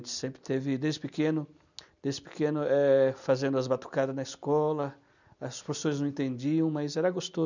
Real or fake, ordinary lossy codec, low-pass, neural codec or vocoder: real; none; 7.2 kHz; none